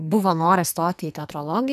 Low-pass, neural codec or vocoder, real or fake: 14.4 kHz; codec, 44.1 kHz, 2.6 kbps, SNAC; fake